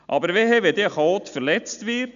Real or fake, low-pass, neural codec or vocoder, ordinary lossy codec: real; 7.2 kHz; none; none